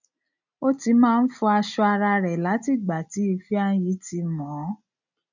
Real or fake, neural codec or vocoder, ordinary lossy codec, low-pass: real; none; none; 7.2 kHz